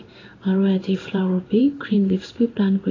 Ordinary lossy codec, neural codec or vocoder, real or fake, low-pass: AAC, 32 kbps; codec, 16 kHz in and 24 kHz out, 1 kbps, XY-Tokenizer; fake; 7.2 kHz